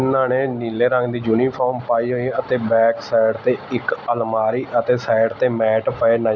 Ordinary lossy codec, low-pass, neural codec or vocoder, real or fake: none; 7.2 kHz; none; real